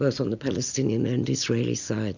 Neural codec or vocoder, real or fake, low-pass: none; real; 7.2 kHz